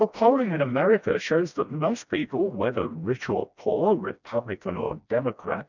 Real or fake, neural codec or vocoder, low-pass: fake; codec, 16 kHz, 1 kbps, FreqCodec, smaller model; 7.2 kHz